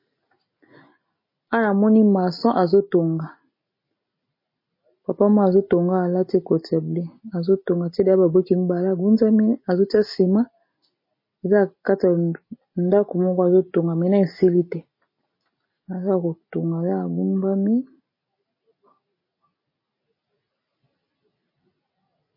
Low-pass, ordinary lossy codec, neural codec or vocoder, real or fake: 5.4 kHz; MP3, 24 kbps; none; real